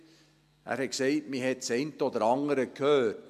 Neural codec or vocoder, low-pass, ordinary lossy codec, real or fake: none; 14.4 kHz; MP3, 96 kbps; real